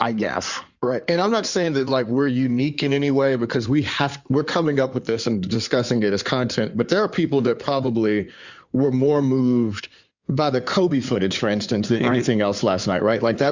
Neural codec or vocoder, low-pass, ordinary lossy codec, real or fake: codec, 16 kHz in and 24 kHz out, 2.2 kbps, FireRedTTS-2 codec; 7.2 kHz; Opus, 64 kbps; fake